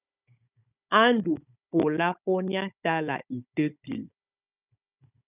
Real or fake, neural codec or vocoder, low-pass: fake; codec, 16 kHz, 16 kbps, FunCodec, trained on Chinese and English, 50 frames a second; 3.6 kHz